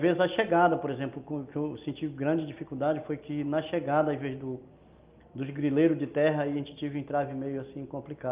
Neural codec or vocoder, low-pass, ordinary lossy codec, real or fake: none; 3.6 kHz; Opus, 24 kbps; real